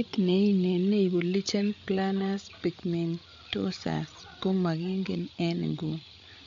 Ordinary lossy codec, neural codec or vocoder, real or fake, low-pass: MP3, 64 kbps; codec, 16 kHz, 8 kbps, FreqCodec, larger model; fake; 7.2 kHz